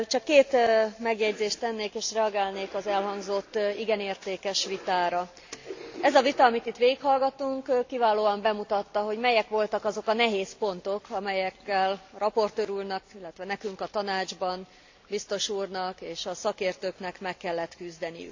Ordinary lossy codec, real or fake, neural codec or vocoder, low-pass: AAC, 48 kbps; real; none; 7.2 kHz